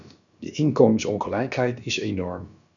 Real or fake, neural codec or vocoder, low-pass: fake; codec, 16 kHz, about 1 kbps, DyCAST, with the encoder's durations; 7.2 kHz